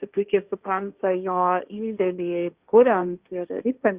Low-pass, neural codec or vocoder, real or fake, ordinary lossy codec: 3.6 kHz; codec, 16 kHz, 1.1 kbps, Voila-Tokenizer; fake; Opus, 64 kbps